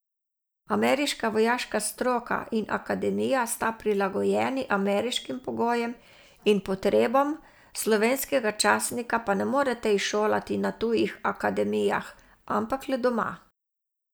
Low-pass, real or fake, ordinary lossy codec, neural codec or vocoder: none; real; none; none